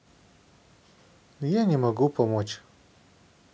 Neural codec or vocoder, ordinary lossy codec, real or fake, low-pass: none; none; real; none